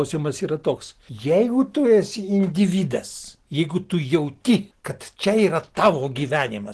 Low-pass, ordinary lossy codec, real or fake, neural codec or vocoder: 10.8 kHz; Opus, 16 kbps; real; none